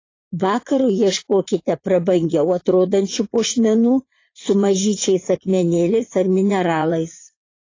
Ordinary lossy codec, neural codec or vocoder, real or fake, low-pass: AAC, 32 kbps; vocoder, 44.1 kHz, 80 mel bands, Vocos; fake; 7.2 kHz